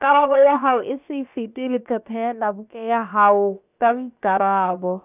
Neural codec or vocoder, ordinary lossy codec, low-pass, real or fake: codec, 16 kHz, about 1 kbps, DyCAST, with the encoder's durations; none; 3.6 kHz; fake